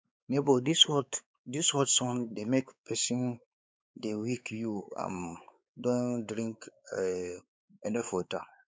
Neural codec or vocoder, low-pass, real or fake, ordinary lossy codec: codec, 16 kHz, 4 kbps, X-Codec, WavLM features, trained on Multilingual LibriSpeech; none; fake; none